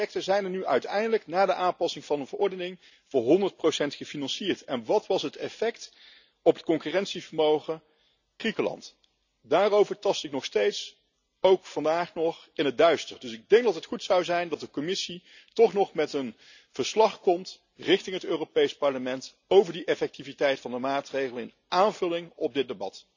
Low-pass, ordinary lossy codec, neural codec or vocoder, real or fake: 7.2 kHz; none; none; real